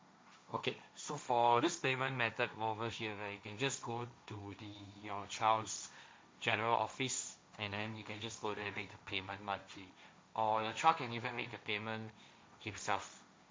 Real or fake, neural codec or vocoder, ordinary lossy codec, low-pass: fake; codec, 16 kHz, 1.1 kbps, Voila-Tokenizer; none; none